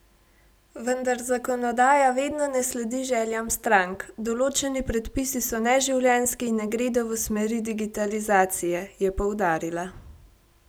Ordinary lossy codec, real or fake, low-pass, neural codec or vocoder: none; real; none; none